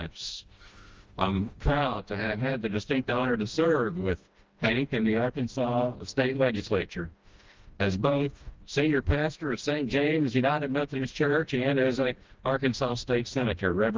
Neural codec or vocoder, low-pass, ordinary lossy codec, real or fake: codec, 16 kHz, 1 kbps, FreqCodec, smaller model; 7.2 kHz; Opus, 32 kbps; fake